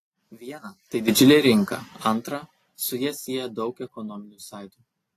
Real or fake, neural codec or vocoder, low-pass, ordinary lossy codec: real; none; 14.4 kHz; AAC, 48 kbps